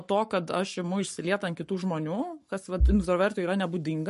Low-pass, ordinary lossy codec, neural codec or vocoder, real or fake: 14.4 kHz; MP3, 48 kbps; codec, 44.1 kHz, 7.8 kbps, Pupu-Codec; fake